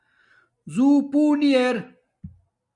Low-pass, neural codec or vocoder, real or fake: 10.8 kHz; none; real